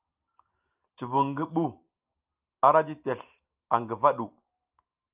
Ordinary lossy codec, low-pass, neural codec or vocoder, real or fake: Opus, 24 kbps; 3.6 kHz; none; real